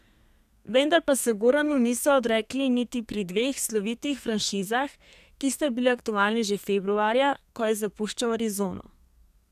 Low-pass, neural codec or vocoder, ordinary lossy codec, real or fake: 14.4 kHz; codec, 32 kHz, 1.9 kbps, SNAC; none; fake